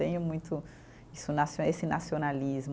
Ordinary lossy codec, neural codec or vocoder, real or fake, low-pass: none; none; real; none